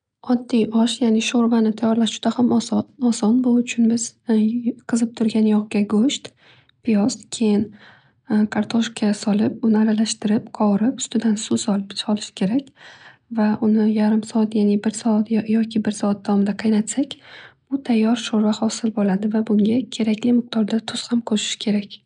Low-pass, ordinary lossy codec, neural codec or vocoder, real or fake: 9.9 kHz; none; none; real